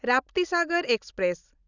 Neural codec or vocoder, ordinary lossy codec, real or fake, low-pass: none; none; real; 7.2 kHz